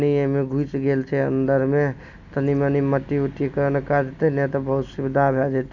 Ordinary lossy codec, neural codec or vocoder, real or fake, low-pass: none; none; real; 7.2 kHz